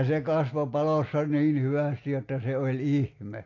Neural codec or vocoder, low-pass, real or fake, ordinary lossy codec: none; 7.2 kHz; real; AAC, 32 kbps